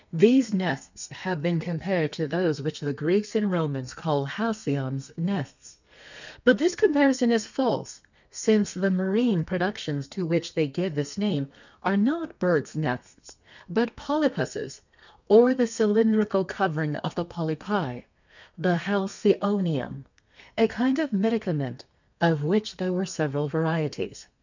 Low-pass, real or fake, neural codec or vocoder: 7.2 kHz; fake; codec, 32 kHz, 1.9 kbps, SNAC